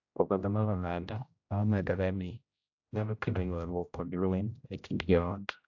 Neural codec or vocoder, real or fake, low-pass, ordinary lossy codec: codec, 16 kHz, 0.5 kbps, X-Codec, HuBERT features, trained on general audio; fake; 7.2 kHz; AAC, 48 kbps